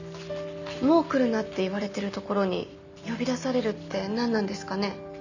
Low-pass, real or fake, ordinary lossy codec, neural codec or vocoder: 7.2 kHz; real; none; none